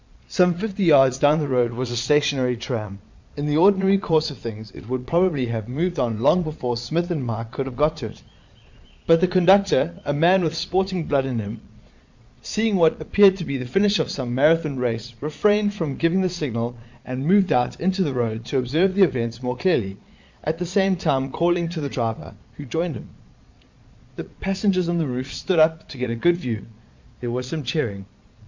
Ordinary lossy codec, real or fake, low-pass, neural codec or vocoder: MP3, 64 kbps; fake; 7.2 kHz; vocoder, 22.05 kHz, 80 mel bands, Vocos